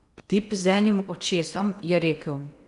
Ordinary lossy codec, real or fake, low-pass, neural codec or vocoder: none; fake; 10.8 kHz; codec, 16 kHz in and 24 kHz out, 0.8 kbps, FocalCodec, streaming, 65536 codes